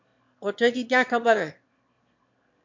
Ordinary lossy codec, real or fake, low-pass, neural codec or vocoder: MP3, 48 kbps; fake; 7.2 kHz; autoencoder, 22.05 kHz, a latent of 192 numbers a frame, VITS, trained on one speaker